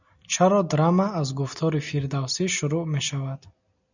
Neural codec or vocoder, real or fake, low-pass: none; real; 7.2 kHz